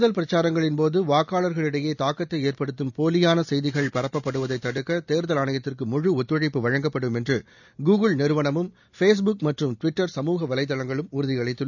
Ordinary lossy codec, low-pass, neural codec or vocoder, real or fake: none; 7.2 kHz; none; real